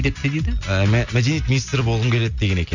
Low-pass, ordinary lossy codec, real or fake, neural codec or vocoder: 7.2 kHz; none; real; none